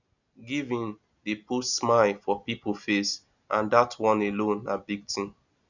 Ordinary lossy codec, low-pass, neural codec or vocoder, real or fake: none; 7.2 kHz; none; real